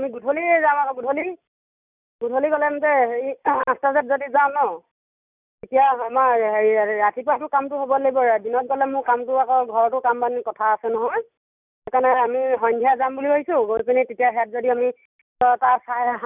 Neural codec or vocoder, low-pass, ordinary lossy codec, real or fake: none; 3.6 kHz; none; real